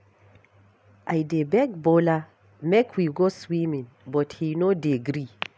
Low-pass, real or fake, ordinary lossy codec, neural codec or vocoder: none; real; none; none